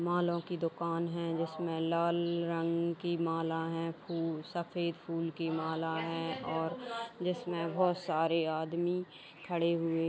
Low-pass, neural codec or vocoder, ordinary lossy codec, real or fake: none; none; none; real